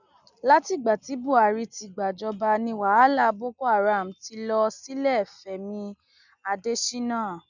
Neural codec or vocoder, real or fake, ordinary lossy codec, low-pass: none; real; none; 7.2 kHz